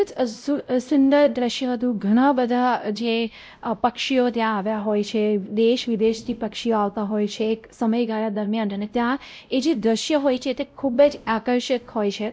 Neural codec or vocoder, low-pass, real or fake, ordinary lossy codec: codec, 16 kHz, 0.5 kbps, X-Codec, WavLM features, trained on Multilingual LibriSpeech; none; fake; none